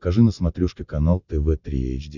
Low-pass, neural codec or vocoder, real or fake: 7.2 kHz; none; real